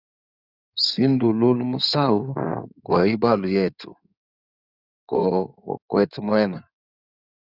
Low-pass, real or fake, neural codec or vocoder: 5.4 kHz; fake; codec, 16 kHz in and 24 kHz out, 2.2 kbps, FireRedTTS-2 codec